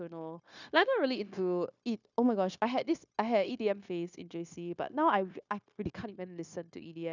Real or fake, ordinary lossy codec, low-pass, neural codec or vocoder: fake; none; 7.2 kHz; codec, 16 kHz, 0.9 kbps, LongCat-Audio-Codec